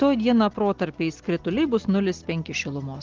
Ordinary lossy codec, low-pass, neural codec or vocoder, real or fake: Opus, 16 kbps; 7.2 kHz; none; real